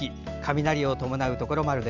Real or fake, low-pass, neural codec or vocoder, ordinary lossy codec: real; 7.2 kHz; none; Opus, 64 kbps